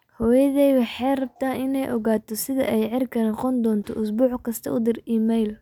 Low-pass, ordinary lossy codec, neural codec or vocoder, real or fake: 19.8 kHz; none; none; real